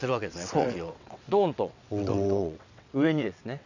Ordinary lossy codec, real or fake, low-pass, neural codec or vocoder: none; fake; 7.2 kHz; vocoder, 22.05 kHz, 80 mel bands, WaveNeXt